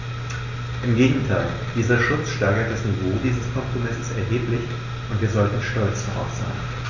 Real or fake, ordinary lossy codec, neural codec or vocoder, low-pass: real; none; none; 7.2 kHz